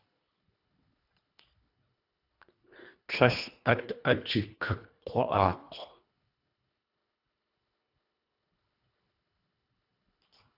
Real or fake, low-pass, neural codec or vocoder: fake; 5.4 kHz; codec, 24 kHz, 1.5 kbps, HILCodec